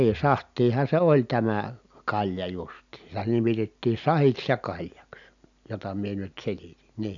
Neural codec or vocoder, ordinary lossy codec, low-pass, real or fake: none; none; 7.2 kHz; real